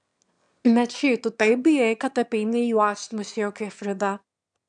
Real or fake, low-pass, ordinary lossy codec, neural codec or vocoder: fake; 9.9 kHz; MP3, 96 kbps; autoencoder, 22.05 kHz, a latent of 192 numbers a frame, VITS, trained on one speaker